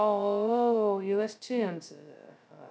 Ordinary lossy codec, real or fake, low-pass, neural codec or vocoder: none; fake; none; codec, 16 kHz, 0.2 kbps, FocalCodec